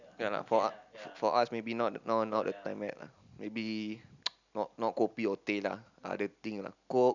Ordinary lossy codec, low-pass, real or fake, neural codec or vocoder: none; 7.2 kHz; real; none